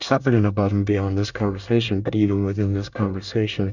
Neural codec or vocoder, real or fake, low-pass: codec, 24 kHz, 1 kbps, SNAC; fake; 7.2 kHz